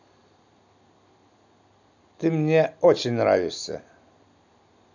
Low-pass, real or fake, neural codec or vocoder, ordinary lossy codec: 7.2 kHz; real; none; none